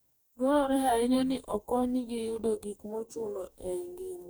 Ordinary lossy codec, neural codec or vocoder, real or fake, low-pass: none; codec, 44.1 kHz, 2.6 kbps, DAC; fake; none